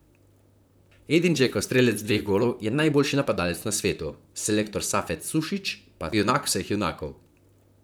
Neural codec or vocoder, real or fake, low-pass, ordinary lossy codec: vocoder, 44.1 kHz, 128 mel bands, Pupu-Vocoder; fake; none; none